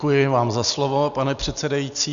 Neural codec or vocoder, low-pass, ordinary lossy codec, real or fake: none; 7.2 kHz; MP3, 96 kbps; real